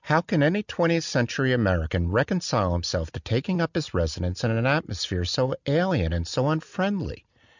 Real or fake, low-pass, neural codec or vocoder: real; 7.2 kHz; none